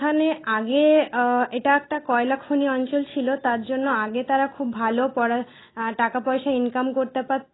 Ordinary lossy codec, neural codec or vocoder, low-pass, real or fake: AAC, 16 kbps; none; 7.2 kHz; real